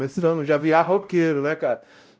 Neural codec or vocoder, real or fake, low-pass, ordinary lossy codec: codec, 16 kHz, 0.5 kbps, X-Codec, WavLM features, trained on Multilingual LibriSpeech; fake; none; none